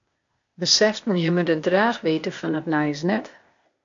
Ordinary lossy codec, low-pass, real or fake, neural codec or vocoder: MP3, 48 kbps; 7.2 kHz; fake; codec, 16 kHz, 0.8 kbps, ZipCodec